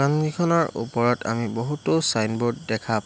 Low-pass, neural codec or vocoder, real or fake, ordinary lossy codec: none; none; real; none